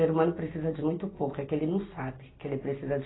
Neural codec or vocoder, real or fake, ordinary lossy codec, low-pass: none; real; AAC, 16 kbps; 7.2 kHz